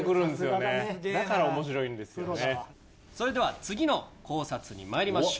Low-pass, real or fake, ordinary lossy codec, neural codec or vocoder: none; real; none; none